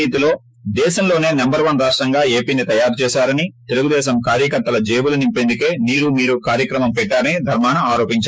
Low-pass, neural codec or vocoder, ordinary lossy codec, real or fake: none; codec, 16 kHz, 6 kbps, DAC; none; fake